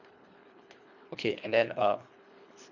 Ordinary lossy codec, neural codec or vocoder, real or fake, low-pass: none; codec, 24 kHz, 3 kbps, HILCodec; fake; 7.2 kHz